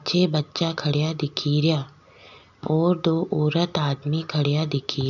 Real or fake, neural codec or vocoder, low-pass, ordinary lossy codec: real; none; 7.2 kHz; none